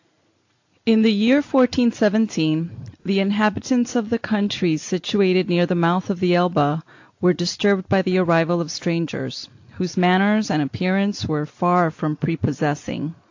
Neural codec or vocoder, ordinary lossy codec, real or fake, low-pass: none; AAC, 48 kbps; real; 7.2 kHz